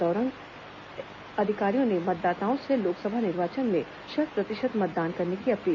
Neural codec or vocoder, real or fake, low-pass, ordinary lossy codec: none; real; 7.2 kHz; MP3, 48 kbps